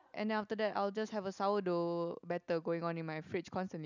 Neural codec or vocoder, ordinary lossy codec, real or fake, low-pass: none; none; real; 7.2 kHz